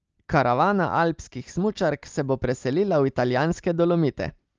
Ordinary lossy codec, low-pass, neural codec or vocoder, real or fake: Opus, 24 kbps; 7.2 kHz; none; real